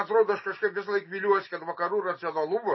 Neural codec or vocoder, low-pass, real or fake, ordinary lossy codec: none; 7.2 kHz; real; MP3, 24 kbps